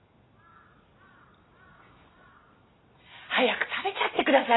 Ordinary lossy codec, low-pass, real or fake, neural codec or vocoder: AAC, 16 kbps; 7.2 kHz; real; none